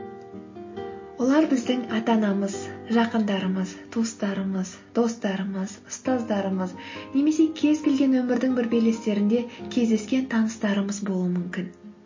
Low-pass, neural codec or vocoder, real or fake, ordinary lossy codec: 7.2 kHz; none; real; MP3, 32 kbps